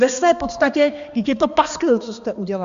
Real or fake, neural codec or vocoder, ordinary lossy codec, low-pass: fake; codec, 16 kHz, 2 kbps, X-Codec, HuBERT features, trained on balanced general audio; MP3, 64 kbps; 7.2 kHz